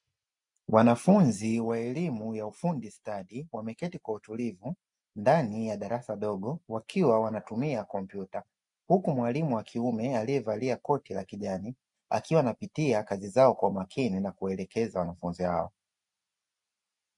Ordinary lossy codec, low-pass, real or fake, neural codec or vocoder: MP3, 64 kbps; 10.8 kHz; real; none